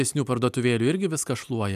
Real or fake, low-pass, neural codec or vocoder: real; 14.4 kHz; none